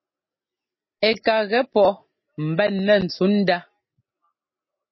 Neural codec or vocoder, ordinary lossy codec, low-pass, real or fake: none; MP3, 24 kbps; 7.2 kHz; real